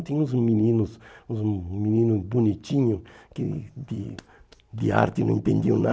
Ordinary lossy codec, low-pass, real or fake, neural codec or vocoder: none; none; real; none